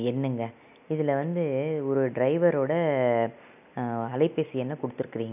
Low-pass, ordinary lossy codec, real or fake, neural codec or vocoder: 3.6 kHz; none; real; none